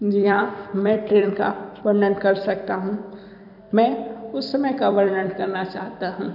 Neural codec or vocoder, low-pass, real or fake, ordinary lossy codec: vocoder, 44.1 kHz, 128 mel bands every 256 samples, BigVGAN v2; 5.4 kHz; fake; none